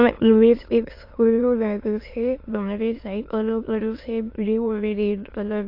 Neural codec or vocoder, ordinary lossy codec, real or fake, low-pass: autoencoder, 22.05 kHz, a latent of 192 numbers a frame, VITS, trained on many speakers; AAC, 32 kbps; fake; 5.4 kHz